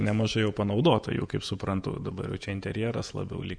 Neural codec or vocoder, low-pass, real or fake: vocoder, 48 kHz, 128 mel bands, Vocos; 9.9 kHz; fake